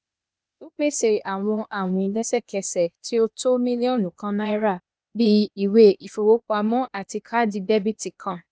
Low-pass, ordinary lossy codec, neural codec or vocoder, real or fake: none; none; codec, 16 kHz, 0.8 kbps, ZipCodec; fake